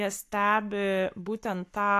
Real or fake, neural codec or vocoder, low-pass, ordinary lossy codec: fake; codec, 44.1 kHz, 7.8 kbps, Pupu-Codec; 14.4 kHz; AAC, 64 kbps